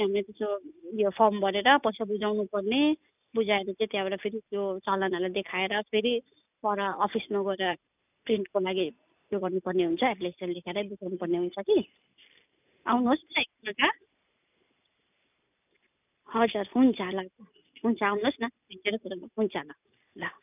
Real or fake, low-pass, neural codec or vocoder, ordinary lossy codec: real; 3.6 kHz; none; none